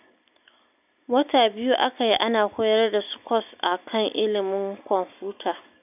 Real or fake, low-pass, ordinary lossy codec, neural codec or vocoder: real; 3.6 kHz; none; none